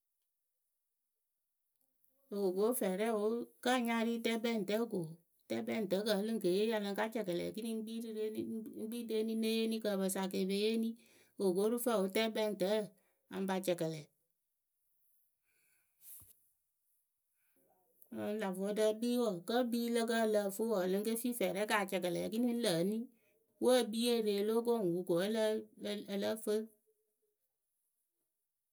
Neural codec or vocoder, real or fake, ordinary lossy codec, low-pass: none; real; none; none